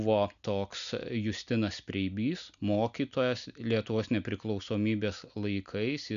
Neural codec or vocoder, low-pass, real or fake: none; 7.2 kHz; real